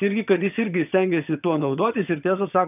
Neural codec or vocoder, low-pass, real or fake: vocoder, 22.05 kHz, 80 mel bands, HiFi-GAN; 3.6 kHz; fake